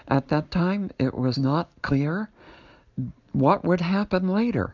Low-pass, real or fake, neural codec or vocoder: 7.2 kHz; real; none